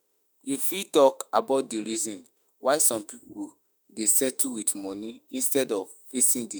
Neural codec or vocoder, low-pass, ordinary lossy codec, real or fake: autoencoder, 48 kHz, 32 numbers a frame, DAC-VAE, trained on Japanese speech; none; none; fake